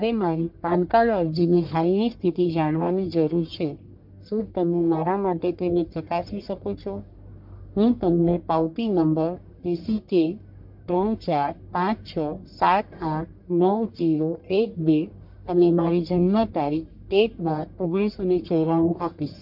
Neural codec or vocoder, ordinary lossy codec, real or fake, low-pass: codec, 44.1 kHz, 1.7 kbps, Pupu-Codec; MP3, 48 kbps; fake; 5.4 kHz